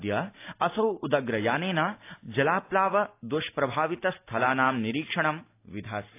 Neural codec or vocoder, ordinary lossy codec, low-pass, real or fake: none; AAC, 24 kbps; 3.6 kHz; real